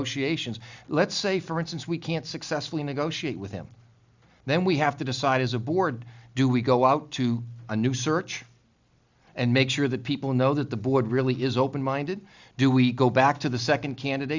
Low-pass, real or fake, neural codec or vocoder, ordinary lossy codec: 7.2 kHz; real; none; Opus, 64 kbps